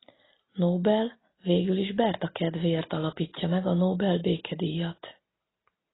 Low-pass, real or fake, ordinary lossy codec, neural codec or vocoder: 7.2 kHz; real; AAC, 16 kbps; none